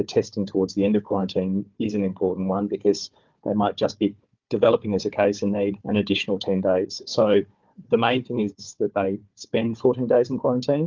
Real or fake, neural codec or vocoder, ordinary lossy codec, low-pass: fake; codec, 16 kHz, 4 kbps, FunCodec, trained on Chinese and English, 50 frames a second; Opus, 24 kbps; 7.2 kHz